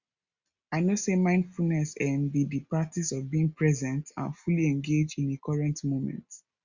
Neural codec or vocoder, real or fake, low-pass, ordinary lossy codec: none; real; 7.2 kHz; Opus, 64 kbps